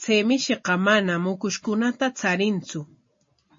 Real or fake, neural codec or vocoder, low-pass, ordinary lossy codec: real; none; 7.2 kHz; MP3, 32 kbps